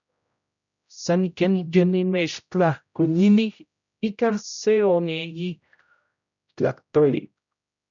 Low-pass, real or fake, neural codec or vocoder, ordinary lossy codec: 7.2 kHz; fake; codec, 16 kHz, 0.5 kbps, X-Codec, HuBERT features, trained on general audio; MP3, 96 kbps